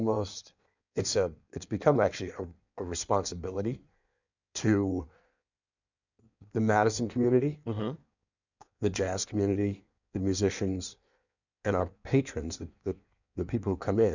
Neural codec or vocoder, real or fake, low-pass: codec, 16 kHz in and 24 kHz out, 1.1 kbps, FireRedTTS-2 codec; fake; 7.2 kHz